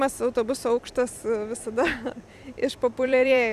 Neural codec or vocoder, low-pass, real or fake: vocoder, 44.1 kHz, 128 mel bands every 256 samples, BigVGAN v2; 14.4 kHz; fake